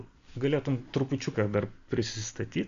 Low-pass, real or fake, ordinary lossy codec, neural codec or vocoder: 7.2 kHz; real; AAC, 48 kbps; none